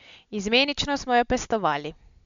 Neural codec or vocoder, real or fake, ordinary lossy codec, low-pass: none; real; MP3, 64 kbps; 7.2 kHz